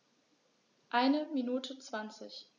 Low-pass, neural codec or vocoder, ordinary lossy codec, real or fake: 7.2 kHz; none; none; real